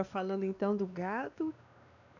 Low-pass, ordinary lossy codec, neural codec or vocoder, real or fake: 7.2 kHz; none; codec, 16 kHz, 2 kbps, X-Codec, WavLM features, trained on Multilingual LibriSpeech; fake